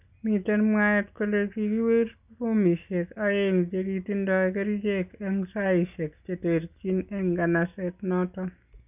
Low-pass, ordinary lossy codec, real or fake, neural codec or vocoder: 3.6 kHz; none; real; none